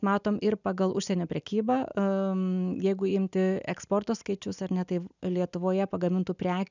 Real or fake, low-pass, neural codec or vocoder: real; 7.2 kHz; none